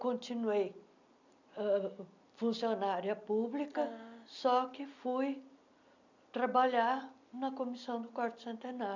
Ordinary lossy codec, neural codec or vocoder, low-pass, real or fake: none; none; 7.2 kHz; real